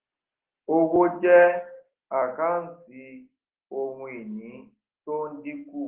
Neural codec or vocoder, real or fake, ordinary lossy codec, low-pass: none; real; Opus, 16 kbps; 3.6 kHz